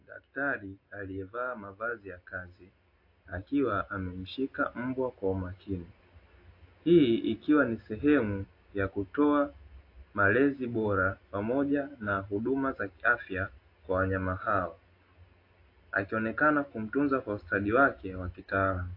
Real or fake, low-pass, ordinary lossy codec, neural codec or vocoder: real; 5.4 kHz; AAC, 32 kbps; none